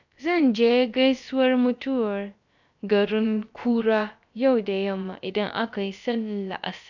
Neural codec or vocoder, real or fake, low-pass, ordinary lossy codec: codec, 16 kHz, about 1 kbps, DyCAST, with the encoder's durations; fake; 7.2 kHz; none